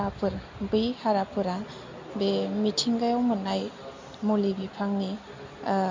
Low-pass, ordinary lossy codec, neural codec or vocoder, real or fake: 7.2 kHz; MP3, 48 kbps; none; real